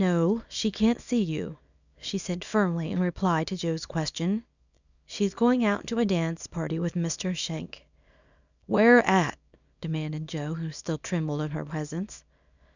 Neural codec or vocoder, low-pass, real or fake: codec, 24 kHz, 0.9 kbps, WavTokenizer, small release; 7.2 kHz; fake